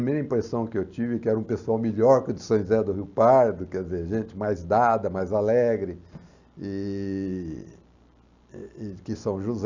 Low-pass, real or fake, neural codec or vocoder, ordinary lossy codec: 7.2 kHz; real; none; none